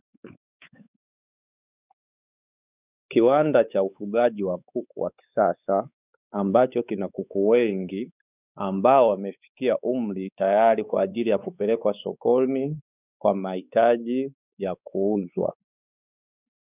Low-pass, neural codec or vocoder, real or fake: 3.6 kHz; codec, 16 kHz, 4 kbps, X-Codec, WavLM features, trained on Multilingual LibriSpeech; fake